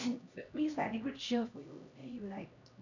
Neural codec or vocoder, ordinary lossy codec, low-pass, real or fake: codec, 16 kHz, 1 kbps, X-Codec, HuBERT features, trained on LibriSpeech; none; 7.2 kHz; fake